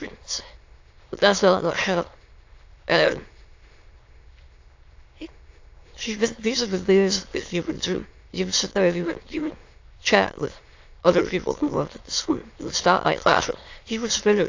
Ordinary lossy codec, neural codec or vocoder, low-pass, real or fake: AAC, 48 kbps; autoencoder, 22.05 kHz, a latent of 192 numbers a frame, VITS, trained on many speakers; 7.2 kHz; fake